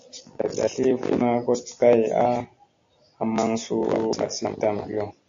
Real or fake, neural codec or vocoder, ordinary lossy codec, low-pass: real; none; AAC, 64 kbps; 7.2 kHz